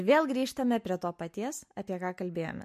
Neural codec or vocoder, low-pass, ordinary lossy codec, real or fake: none; 14.4 kHz; MP3, 64 kbps; real